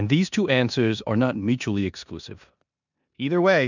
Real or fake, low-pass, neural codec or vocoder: fake; 7.2 kHz; codec, 16 kHz in and 24 kHz out, 0.9 kbps, LongCat-Audio-Codec, four codebook decoder